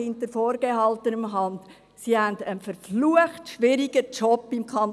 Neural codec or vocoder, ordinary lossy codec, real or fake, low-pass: none; none; real; none